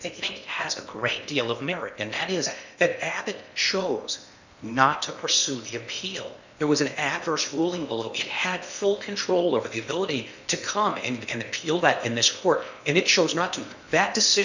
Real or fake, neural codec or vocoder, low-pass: fake; codec, 16 kHz in and 24 kHz out, 0.8 kbps, FocalCodec, streaming, 65536 codes; 7.2 kHz